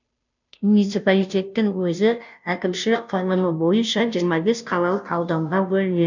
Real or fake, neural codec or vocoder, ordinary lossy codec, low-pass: fake; codec, 16 kHz, 0.5 kbps, FunCodec, trained on Chinese and English, 25 frames a second; none; 7.2 kHz